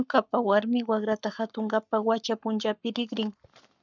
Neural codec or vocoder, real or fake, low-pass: codec, 44.1 kHz, 7.8 kbps, Pupu-Codec; fake; 7.2 kHz